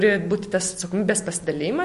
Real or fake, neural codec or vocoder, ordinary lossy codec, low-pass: real; none; MP3, 48 kbps; 14.4 kHz